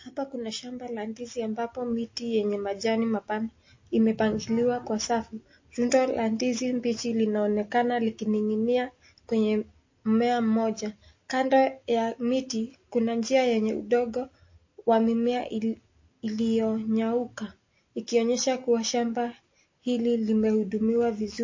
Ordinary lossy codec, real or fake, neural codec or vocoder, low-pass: MP3, 32 kbps; real; none; 7.2 kHz